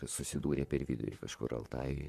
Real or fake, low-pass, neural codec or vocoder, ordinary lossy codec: fake; 14.4 kHz; vocoder, 44.1 kHz, 128 mel bands, Pupu-Vocoder; MP3, 64 kbps